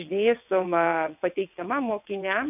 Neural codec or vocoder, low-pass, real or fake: vocoder, 22.05 kHz, 80 mel bands, WaveNeXt; 3.6 kHz; fake